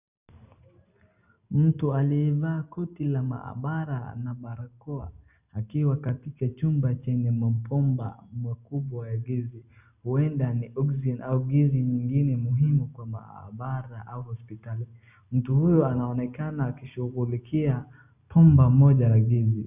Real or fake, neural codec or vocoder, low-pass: real; none; 3.6 kHz